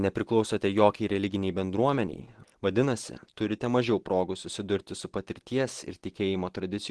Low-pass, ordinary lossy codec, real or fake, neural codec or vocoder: 10.8 kHz; Opus, 16 kbps; real; none